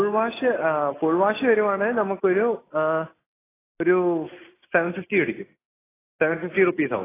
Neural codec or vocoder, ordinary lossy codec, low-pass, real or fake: none; AAC, 16 kbps; 3.6 kHz; real